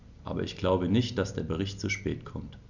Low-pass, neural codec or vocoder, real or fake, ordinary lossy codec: 7.2 kHz; none; real; none